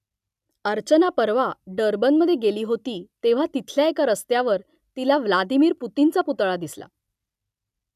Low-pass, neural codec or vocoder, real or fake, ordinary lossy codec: 14.4 kHz; none; real; none